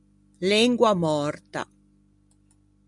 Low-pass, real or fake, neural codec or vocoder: 10.8 kHz; real; none